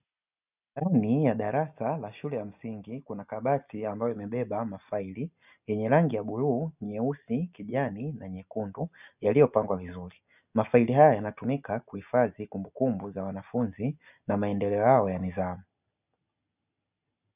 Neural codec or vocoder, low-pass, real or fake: none; 3.6 kHz; real